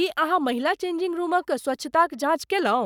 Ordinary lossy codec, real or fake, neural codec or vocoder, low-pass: none; fake; codec, 44.1 kHz, 7.8 kbps, Pupu-Codec; 19.8 kHz